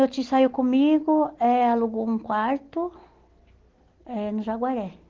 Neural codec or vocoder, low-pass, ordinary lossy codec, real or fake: none; 7.2 kHz; Opus, 16 kbps; real